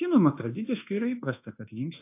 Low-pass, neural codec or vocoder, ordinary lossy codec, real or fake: 3.6 kHz; codec, 24 kHz, 1.2 kbps, DualCodec; AAC, 24 kbps; fake